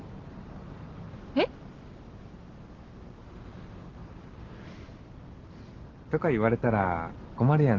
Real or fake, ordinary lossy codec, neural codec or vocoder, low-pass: real; Opus, 16 kbps; none; 7.2 kHz